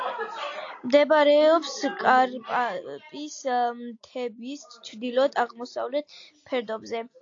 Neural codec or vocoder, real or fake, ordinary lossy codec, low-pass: none; real; AAC, 48 kbps; 7.2 kHz